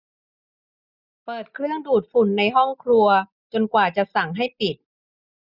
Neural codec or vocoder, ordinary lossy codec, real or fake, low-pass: none; none; real; 5.4 kHz